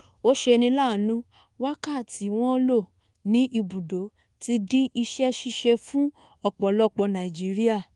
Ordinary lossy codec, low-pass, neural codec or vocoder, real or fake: Opus, 24 kbps; 10.8 kHz; codec, 24 kHz, 1.2 kbps, DualCodec; fake